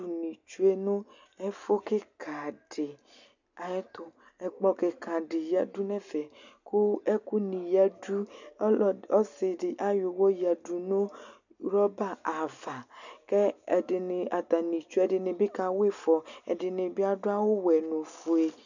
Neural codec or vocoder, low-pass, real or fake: none; 7.2 kHz; real